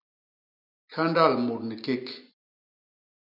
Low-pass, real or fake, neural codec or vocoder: 5.4 kHz; real; none